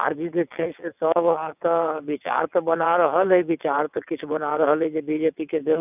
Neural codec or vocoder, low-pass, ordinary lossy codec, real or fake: vocoder, 22.05 kHz, 80 mel bands, WaveNeXt; 3.6 kHz; none; fake